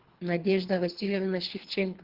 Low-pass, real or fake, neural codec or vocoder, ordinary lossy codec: 5.4 kHz; fake; codec, 24 kHz, 3 kbps, HILCodec; Opus, 16 kbps